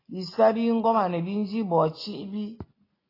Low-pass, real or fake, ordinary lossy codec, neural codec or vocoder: 5.4 kHz; real; AAC, 24 kbps; none